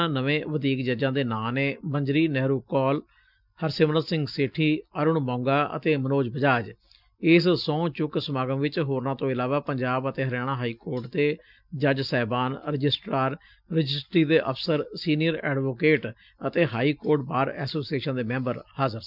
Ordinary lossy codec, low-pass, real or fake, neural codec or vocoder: none; 5.4 kHz; real; none